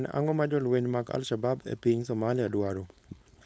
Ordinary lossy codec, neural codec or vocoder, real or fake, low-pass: none; codec, 16 kHz, 4.8 kbps, FACodec; fake; none